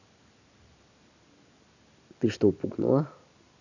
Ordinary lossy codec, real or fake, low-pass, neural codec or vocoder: none; real; 7.2 kHz; none